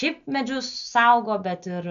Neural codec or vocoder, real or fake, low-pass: none; real; 7.2 kHz